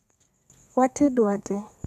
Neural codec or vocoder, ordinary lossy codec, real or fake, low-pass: codec, 32 kHz, 1.9 kbps, SNAC; none; fake; 14.4 kHz